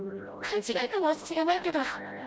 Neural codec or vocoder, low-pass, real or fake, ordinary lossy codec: codec, 16 kHz, 0.5 kbps, FreqCodec, smaller model; none; fake; none